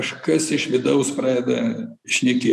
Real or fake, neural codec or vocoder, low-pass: real; none; 14.4 kHz